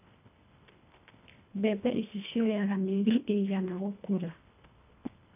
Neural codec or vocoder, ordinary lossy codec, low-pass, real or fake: codec, 24 kHz, 1.5 kbps, HILCodec; none; 3.6 kHz; fake